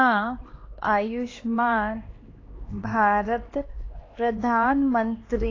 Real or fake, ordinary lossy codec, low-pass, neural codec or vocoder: fake; AAC, 32 kbps; 7.2 kHz; codec, 16 kHz, 2 kbps, X-Codec, HuBERT features, trained on LibriSpeech